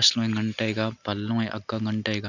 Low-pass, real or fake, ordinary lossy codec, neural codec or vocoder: 7.2 kHz; real; none; none